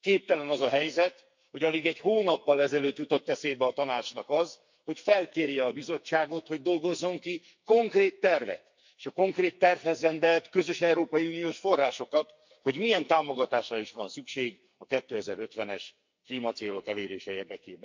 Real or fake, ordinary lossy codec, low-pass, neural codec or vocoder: fake; MP3, 48 kbps; 7.2 kHz; codec, 44.1 kHz, 2.6 kbps, SNAC